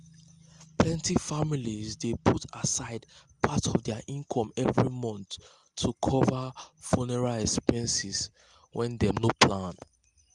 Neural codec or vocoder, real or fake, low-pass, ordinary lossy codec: none; real; 10.8 kHz; Opus, 32 kbps